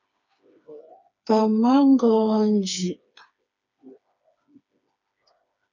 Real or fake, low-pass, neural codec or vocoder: fake; 7.2 kHz; codec, 16 kHz, 4 kbps, FreqCodec, smaller model